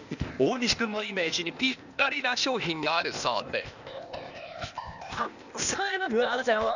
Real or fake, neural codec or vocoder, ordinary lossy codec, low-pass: fake; codec, 16 kHz, 0.8 kbps, ZipCodec; none; 7.2 kHz